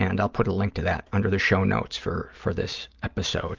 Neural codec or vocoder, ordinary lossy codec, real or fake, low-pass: none; Opus, 24 kbps; real; 7.2 kHz